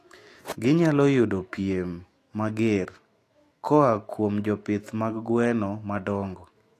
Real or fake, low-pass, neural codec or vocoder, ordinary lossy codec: fake; 14.4 kHz; autoencoder, 48 kHz, 128 numbers a frame, DAC-VAE, trained on Japanese speech; AAC, 48 kbps